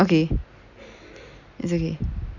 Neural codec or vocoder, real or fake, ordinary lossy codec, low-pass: none; real; none; 7.2 kHz